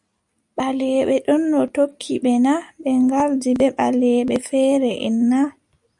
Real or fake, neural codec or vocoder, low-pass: real; none; 10.8 kHz